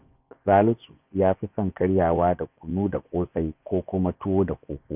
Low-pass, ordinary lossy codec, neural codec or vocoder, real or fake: 3.6 kHz; none; vocoder, 24 kHz, 100 mel bands, Vocos; fake